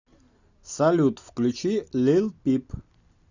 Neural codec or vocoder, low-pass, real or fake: none; 7.2 kHz; real